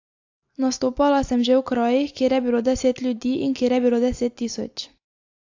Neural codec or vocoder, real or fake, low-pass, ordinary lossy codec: none; real; 7.2 kHz; AAC, 48 kbps